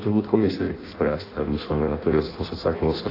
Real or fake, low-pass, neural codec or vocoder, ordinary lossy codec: fake; 5.4 kHz; codec, 16 kHz in and 24 kHz out, 0.6 kbps, FireRedTTS-2 codec; AAC, 24 kbps